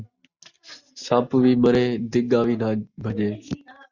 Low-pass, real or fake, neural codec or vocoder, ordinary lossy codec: 7.2 kHz; real; none; Opus, 64 kbps